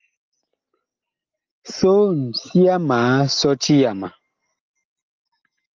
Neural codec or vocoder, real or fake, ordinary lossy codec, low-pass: none; real; Opus, 24 kbps; 7.2 kHz